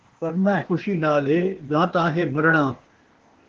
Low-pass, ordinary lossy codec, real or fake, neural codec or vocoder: 7.2 kHz; Opus, 16 kbps; fake; codec, 16 kHz, 0.8 kbps, ZipCodec